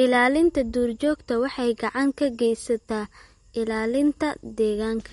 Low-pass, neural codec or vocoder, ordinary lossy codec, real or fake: 19.8 kHz; none; MP3, 48 kbps; real